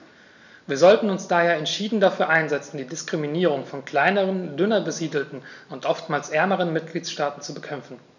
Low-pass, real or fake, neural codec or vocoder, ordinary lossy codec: 7.2 kHz; real; none; none